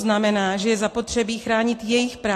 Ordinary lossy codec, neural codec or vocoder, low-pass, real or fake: AAC, 48 kbps; none; 14.4 kHz; real